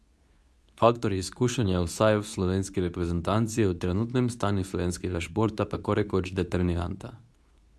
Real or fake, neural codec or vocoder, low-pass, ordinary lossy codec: fake; codec, 24 kHz, 0.9 kbps, WavTokenizer, medium speech release version 2; none; none